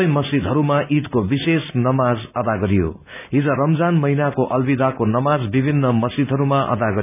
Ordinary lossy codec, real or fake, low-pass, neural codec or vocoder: none; real; 3.6 kHz; none